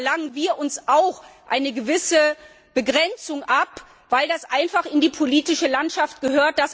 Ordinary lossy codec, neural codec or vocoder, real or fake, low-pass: none; none; real; none